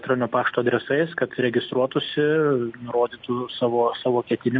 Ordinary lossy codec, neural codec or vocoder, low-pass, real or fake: MP3, 48 kbps; none; 7.2 kHz; real